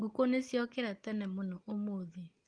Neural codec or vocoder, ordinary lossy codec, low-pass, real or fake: none; Opus, 24 kbps; 9.9 kHz; real